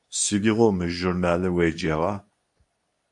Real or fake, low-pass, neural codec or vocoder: fake; 10.8 kHz; codec, 24 kHz, 0.9 kbps, WavTokenizer, medium speech release version 2